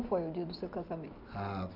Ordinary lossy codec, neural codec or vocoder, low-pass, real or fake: none; none; 5.4 kHz; real